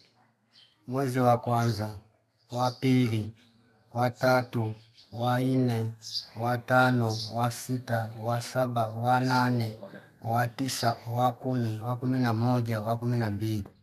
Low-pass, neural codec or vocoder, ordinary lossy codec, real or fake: 14.4 kHz; codec, 32 kHz, 1.9 kbps, SNAC; none; fake